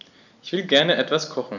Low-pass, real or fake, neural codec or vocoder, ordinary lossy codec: 7.2 kHz; real; none; none